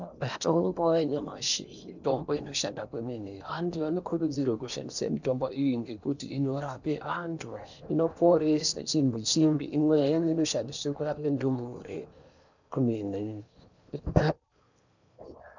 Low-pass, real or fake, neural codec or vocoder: 7.2 kHz; fake; codec, 16 kHz in and 24 kHz out, 0.8 kbps, FocalCodec, streaming, 65536 codes